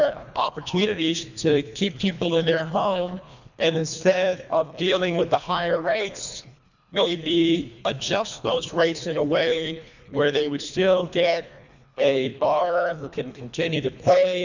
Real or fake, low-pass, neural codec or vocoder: fake; 7.2 kHz; codec, 24 kHz, 1.5 kbps, HILCodec